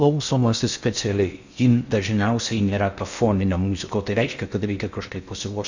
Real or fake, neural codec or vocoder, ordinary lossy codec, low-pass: fake; codec, 16 kHz in and 24 kHz out, 0.6 kbps, FocalCodec, streaming, 2048 codes; Opus, 64 kbps; 7.2 kHz